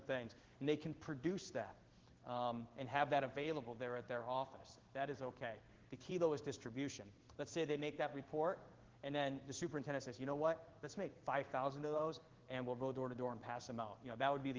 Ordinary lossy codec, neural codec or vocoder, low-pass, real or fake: Opus, 16 kbps; codec, 16 kHz in and 24 kHz out, 1 kbps, XY-Tokenizer; 7.2 kHz; fake